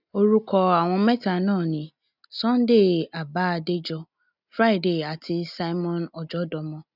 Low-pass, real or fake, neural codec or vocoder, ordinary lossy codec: 5.4 kHz; real; none; none